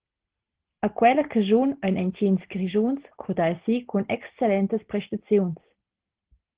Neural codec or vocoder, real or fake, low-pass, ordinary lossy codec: none; real; 3.6 kHz; Opus, 16 kbps